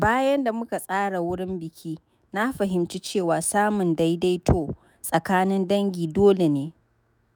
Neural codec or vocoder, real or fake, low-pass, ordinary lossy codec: autoencoder, 48 kHz, 128 numbers a frame, DAC-VAE, trained on Japanese speech; fake; none; none